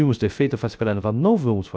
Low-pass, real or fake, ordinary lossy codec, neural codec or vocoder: none; fake; none; codec, 16 kHz, 0.3 kbps, FocalCodec